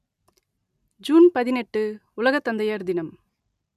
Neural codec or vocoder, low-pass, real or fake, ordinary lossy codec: none; 14.4 kHz; real; none